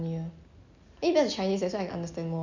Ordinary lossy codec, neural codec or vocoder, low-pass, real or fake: none; none; 7.2 kHz; real